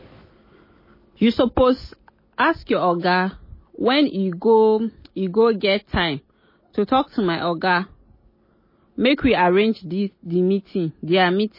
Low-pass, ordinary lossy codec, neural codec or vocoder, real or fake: 5.4 kHz; MP3, 24 kbps; none; real